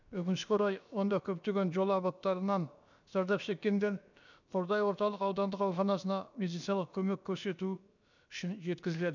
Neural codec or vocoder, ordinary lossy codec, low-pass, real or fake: codec, 16 kHz, about 1 kbps, DyCAST, with the encoder's durations; none; 7.2 kHz; fake